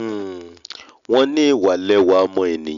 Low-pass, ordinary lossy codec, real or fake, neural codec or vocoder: 7.2 kHz; none; real; none